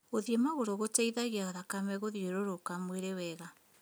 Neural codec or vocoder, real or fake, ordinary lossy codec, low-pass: none; real; none; none